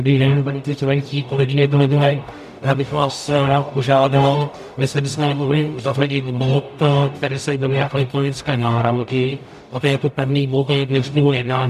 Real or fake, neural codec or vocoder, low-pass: fake; codec, 44.1 kHz, 0.9 kbps, DAC; 14.4 kHz